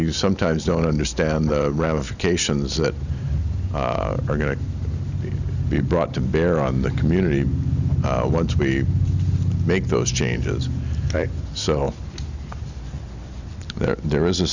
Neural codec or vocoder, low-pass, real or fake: none; 7.2 kHz; real